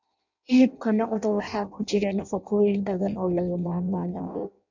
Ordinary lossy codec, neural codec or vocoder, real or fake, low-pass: none; codec, 16 kHz in and 24 kHz out, 0.6 kbps, FireRedTTS-2 codec; fake; 7.2 kHz